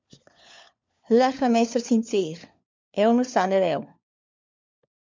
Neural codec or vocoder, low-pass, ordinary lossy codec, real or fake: codec, 16 kHz, 4 kbps, FunCodec, trained on LibriTTS, 50 frames a second; 7.2 kHz; MP3, 64 kbps; fake